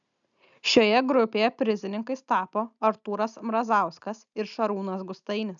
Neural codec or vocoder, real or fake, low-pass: none; real; 7.2 kHz